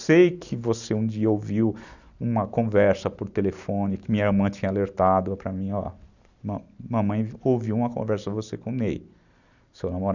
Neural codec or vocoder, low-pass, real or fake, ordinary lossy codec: none; 7.2 kHz; real; none